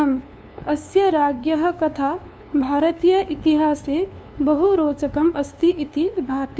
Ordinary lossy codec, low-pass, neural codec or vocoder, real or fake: none; none; codec, 16 kHz, 2 kbps, FunCodec, trained on LibriTTS, 25 frames a second; fake